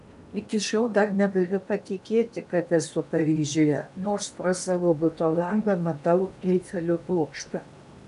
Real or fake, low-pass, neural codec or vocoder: fake; 10.8 kHz; codec, 16 kHz in and 24 kHz out, 0.8 kbps, FocalCodec, streaming, 65536 codes